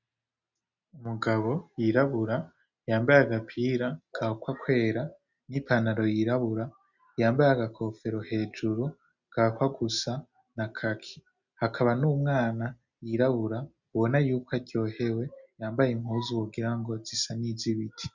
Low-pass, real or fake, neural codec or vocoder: 7.2 kHz; real; none